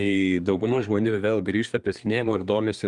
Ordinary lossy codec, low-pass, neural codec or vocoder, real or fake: Opus, 32 kbps; 10.8 kHz; codec, 24 kHz, 1 kbps, SNAC; fake